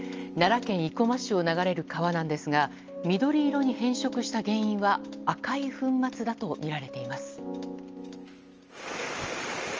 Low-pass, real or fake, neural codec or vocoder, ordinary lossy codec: 7.2 kHz; real; none; Opus, 24 kbps